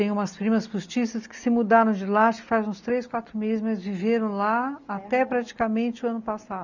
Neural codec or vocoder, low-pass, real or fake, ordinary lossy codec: none; 7.2 kHz; real; none